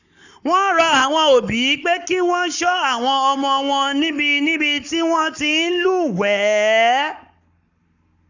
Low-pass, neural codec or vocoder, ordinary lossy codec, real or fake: 7.2 kHz; codec, 44.1 kHz, 7.8 kbps, Pupu-Codec; none; fake